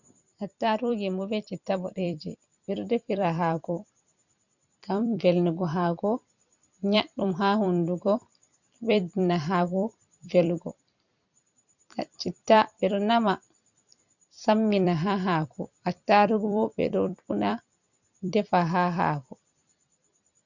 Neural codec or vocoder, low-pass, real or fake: vocoder, 44.1 kHz, 128 mel bands every 256 samples, BigVGAN v2; 7.2 kHz; fake